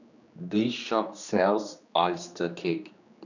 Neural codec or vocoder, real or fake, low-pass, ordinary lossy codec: codec, 16 kHz, 2 kbps, X-Codec, HuBERT features, trained on general audio; fake; 7.2 kHz; none